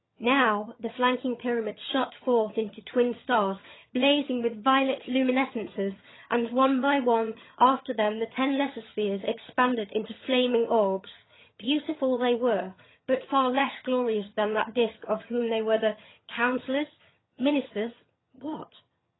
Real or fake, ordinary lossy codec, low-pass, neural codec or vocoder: fake; AAC, 16 kbps; 7.2 kHz; vocoder, 22.05 kHz, 80 mel bands, HiFi-GAN